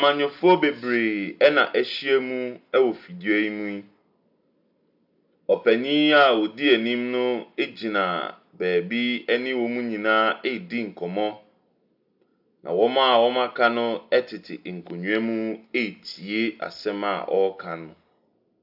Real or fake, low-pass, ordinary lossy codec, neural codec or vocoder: real; 5.4 kHz; AAC, 48 kbps; none